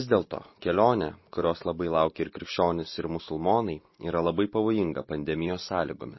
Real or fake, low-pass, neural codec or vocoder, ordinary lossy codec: real; 7.2 kHz; none; MP3, 24 kbps